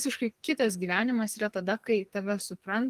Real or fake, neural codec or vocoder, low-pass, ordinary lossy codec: fake; codec, 44.1 kHz, 2.6 kbps, SNAC; 14.4 kHz; Opus, 24 kbps